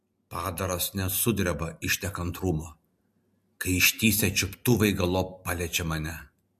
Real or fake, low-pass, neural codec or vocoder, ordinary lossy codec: real; 14.4 kHz; none; MP3, 64 kbps